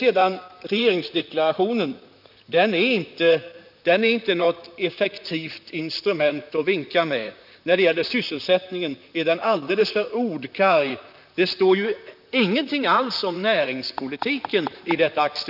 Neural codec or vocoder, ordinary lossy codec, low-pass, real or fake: vocoder, 44.1 kHz, 128 mel bands, Pupu-Vocoder; none; 5.4 kHz; fake